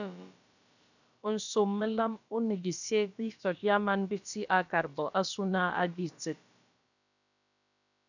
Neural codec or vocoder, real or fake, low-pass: codec, 16 kHz, about 1 kbps, DyCAST, with the encoder's durations; fake; 7.2 kHz